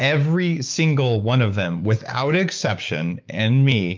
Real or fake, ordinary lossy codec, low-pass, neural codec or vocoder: fake; Opus, 24 kbps; 7.2 kHz; codec, 16 kHz, 6 kbps, DAC